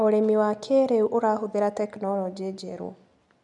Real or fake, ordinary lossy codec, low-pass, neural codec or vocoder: real; none; 10.8 kHz; none